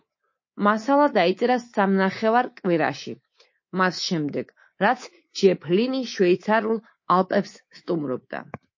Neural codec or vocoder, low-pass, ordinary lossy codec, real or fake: codec, 24 kHz, 3.1 kbps, DualCodec; 7.2 kHz; MP3, 32 kbps; fake